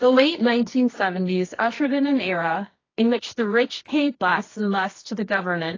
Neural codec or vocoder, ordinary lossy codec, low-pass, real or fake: codec, 24 kHz, 0.9 kbps, WavTokenizer, medium music audio release; AAC, 32 kbps; 7.2 kHz; fake